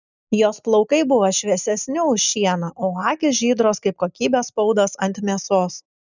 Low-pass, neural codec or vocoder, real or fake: 7.2 kHz; none; real